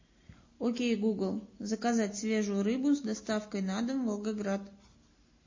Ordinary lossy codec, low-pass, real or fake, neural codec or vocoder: MP3, 32 kbps; 7.2 kHz; real; none